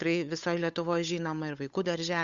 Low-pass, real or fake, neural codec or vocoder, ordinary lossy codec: 7.2 kHz; fake; codec, 16 kHz, 8 kbps, FunCodec, trained on Chinese and English, 25 frames a second; Opus, 64 kbps